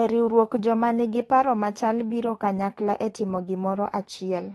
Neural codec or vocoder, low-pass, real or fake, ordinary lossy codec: autoencoder, 48 kHz, 32 numbers a frame, DAC-VAE, trained on Japanese speech; 19.8 kHz; fake; AAC, 32 kbps